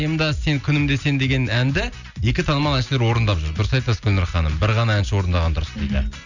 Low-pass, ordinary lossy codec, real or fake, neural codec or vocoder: 7.2 kHz; none; real; none